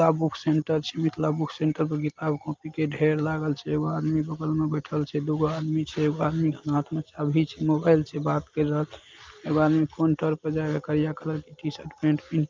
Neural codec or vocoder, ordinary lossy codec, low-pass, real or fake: none; Opus, 24 kbps; 7.2 kHz; real